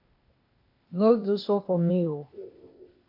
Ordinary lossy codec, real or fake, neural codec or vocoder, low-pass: AAC, 32 kbps; fake; codec, 16 kHz, 0.8 kbps, ZipCodec; 5.4 kHz